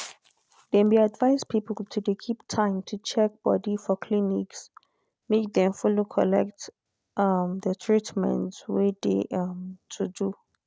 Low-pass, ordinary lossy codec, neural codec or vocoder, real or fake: none; none; none; real